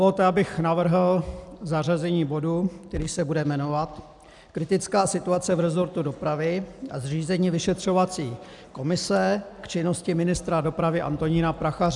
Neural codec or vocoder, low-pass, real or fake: none; 10.8 kHz; real